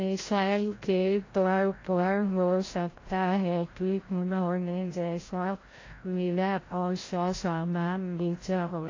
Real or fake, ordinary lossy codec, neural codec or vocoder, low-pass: fake; AAC, 32 kbps; codec, 16 kHz, 0.5 kbps, FreqCodec, larger model; 7.2 kHz